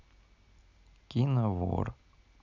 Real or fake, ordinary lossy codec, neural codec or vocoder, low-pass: real; none; none; 7.2 kHz